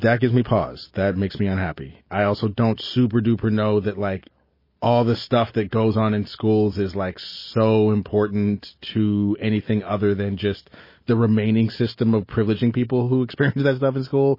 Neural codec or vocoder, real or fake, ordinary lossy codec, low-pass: none; real; MP3, 24 kbps; 5.4 kHz